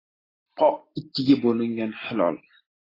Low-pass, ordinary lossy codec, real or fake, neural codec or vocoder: 5.4 kHz; AAC, 24 kbps; real; none